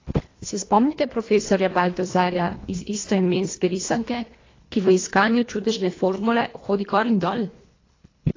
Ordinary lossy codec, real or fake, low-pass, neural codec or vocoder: AAC, 32 kbps; fake; 7.2 kHz; codec, 24 kHz, 1.5 kbps, HILCodec